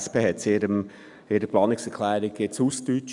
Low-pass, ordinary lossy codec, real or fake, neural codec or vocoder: 10.8 kHz; none; real; none